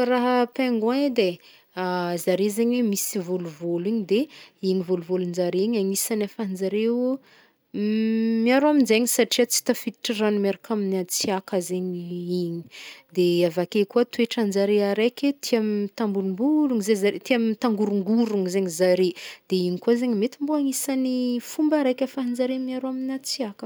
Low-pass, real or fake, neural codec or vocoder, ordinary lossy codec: none; real; none; none